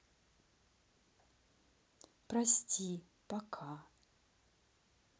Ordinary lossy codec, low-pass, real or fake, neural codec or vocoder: none; none; real; none